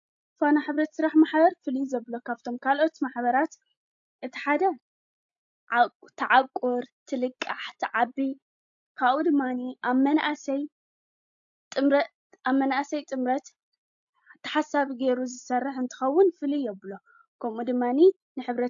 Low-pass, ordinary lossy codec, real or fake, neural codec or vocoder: 7.2 kHz; AAC, 64 kbps; real; none